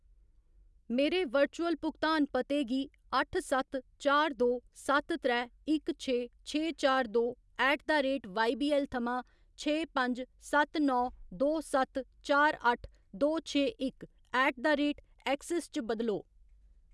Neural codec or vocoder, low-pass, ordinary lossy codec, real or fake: none; none; none; real